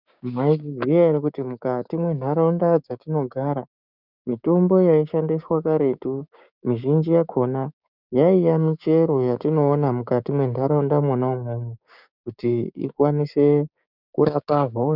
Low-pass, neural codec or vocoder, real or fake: 5.4 kHz; codec, 16 kHz, 6 kbps, DAC; fake